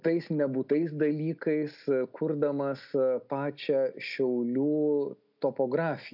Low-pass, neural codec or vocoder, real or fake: 5.4 kHz; none; real